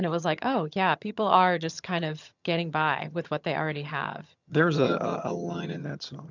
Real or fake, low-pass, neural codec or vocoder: fake; 7.2 kHz; vocoder, 22.05 kHz, 80 mel bands, HiFi-GAN